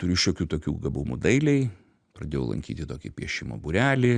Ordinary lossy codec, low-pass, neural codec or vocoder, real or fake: Opus, 64 kbps; 9.9 kHz; none; real